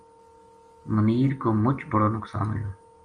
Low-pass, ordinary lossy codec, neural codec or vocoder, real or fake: 9.9 kHz; Opus, 32 kbps; none; real